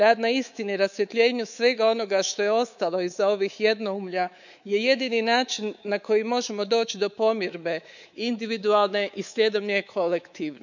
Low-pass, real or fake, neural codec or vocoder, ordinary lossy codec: 7.2 kHz; fake; codec, 24 kHz, 3.1 kbps, DualCodec; none